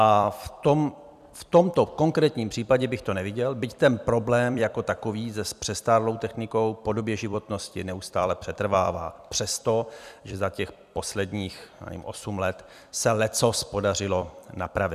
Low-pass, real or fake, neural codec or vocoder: 14.4 kHz; fake; vocoder, 44.1 kHz, 128 mel bands every 256 samples, BigVGAN v2